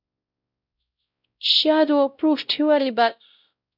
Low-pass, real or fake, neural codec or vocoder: 5.4 kHz; fake; codec, 16 kHz, 0.5 kbps, X-Codec, WavLM features, trained on Multilingual LibriSpeech